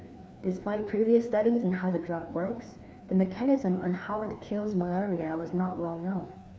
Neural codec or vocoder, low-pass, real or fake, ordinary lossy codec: codec, 16 kHz, 2 kbps, FreqCodec, larger model; none; fake; none